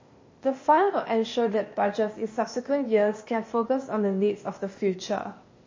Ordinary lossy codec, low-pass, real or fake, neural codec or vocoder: MP3, 32 kbps; 7.2 kHz; fake; codec, 16 kHz, 0.8 kbps, ZipCodec